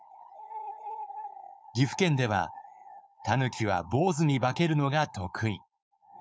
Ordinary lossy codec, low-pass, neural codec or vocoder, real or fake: none; none; codec, 16 kHz, 4.8 kbps, FACodec; fake